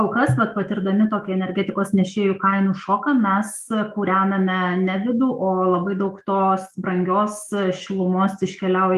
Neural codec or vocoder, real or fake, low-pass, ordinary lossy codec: autoencoder, 48 kHz, 128 numbers a frame, DAC-VAE, trained on Japanese speech; fake; 14.4 kHz; Opus, 32 kbps